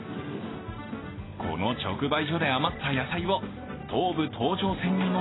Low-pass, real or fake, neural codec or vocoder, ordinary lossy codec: 7.2 kHz; real; none; AAC, 16 kbps